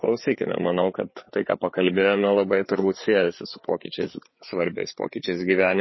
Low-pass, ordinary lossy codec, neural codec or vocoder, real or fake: 7.2 kHz; MP3, 24 kbps; codec, 16 kHz, 4 kbps, FreqCodec, larger model; fake